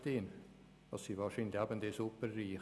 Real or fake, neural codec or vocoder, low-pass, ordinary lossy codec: real; none; none; none